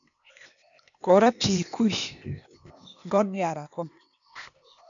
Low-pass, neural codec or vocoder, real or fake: 7.2 kHz; codec, 16 kHz, 0.8 kbps, ZipCodec; fake